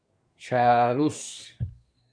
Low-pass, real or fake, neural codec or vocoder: 9.9 kHz; fake; codec, 24 kHz, 1 kbps, SNAC